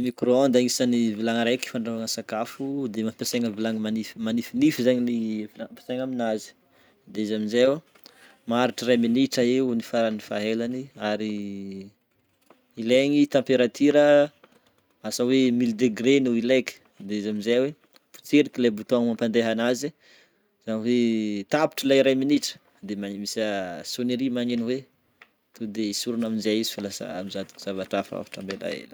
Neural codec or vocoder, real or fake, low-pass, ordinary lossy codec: none; real; none; none